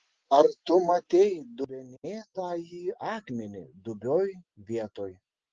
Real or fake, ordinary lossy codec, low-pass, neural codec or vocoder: real; Opus, 16 kbps; 7.2 kHz; none